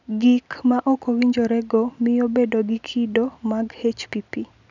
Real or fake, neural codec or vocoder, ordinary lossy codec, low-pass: real; none; none; 7.2 kHz